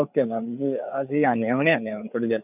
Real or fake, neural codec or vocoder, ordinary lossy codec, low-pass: fake; codec, 16 kHz, 4 kbps, FreqCodec, larger model; none; 3.6 kHz